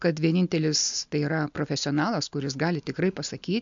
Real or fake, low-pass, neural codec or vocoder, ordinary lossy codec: real; 7.2 kHz; none; MP3, 64 kbps